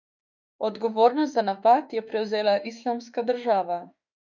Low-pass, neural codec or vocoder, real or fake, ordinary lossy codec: 7.2 kHz; autoencoder, 48 kHz, 32 numbers a frame, DAC-VAE, trained on Japanese speech; fake; none